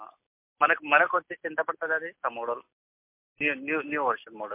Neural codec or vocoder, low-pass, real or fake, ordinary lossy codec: none; 3.6 kHz; real; MP3, 32 kbps